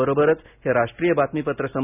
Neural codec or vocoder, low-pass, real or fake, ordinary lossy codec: none; 3.6 kHz; real; none